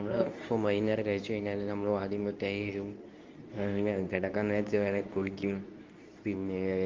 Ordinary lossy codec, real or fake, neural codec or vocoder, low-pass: Opus, 32 kbps; fake; codec, 24 kHz, 0.9 kbps, WavTokenizer, medium speech release version 2; 7.2 kHz